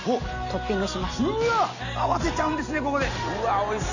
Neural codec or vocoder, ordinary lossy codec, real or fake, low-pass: none; none; real; 7.2 kHz